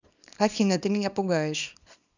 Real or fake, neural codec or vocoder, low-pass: fake; codec, 24 kHz, 0.9 kbps, WavTokenizer, small release; 7.2 kHz